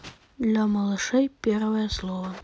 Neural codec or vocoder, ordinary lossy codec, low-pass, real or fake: none; none; none; real